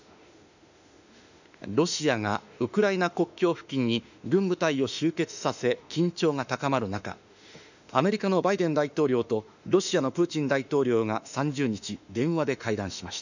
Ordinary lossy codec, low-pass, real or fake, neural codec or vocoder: none; 7.2 kHz; fake; autoencoder, 48 kHz, 32 numbers a frame, DAC-VAE, trained on Japanese speech